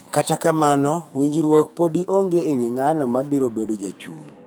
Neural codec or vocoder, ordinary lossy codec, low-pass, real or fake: codec, 44.1 kHz, 2.6 kbps, SNAC; none; none; fake